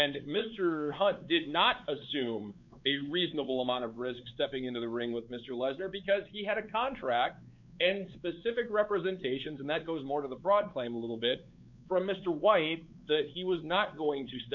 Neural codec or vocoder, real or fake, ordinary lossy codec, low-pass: codec, 16 kHz, 4 kbps, X-Codec, WavLM features, trained on Multilingual LibriSpeech; fake; MP3, 48 kbps; 7.2 kHz